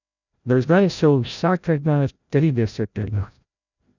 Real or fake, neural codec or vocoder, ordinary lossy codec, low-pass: fake; codec, 16 kHz, 0.5 kbps, FreqCodec, larger model; none; 7.2 kHz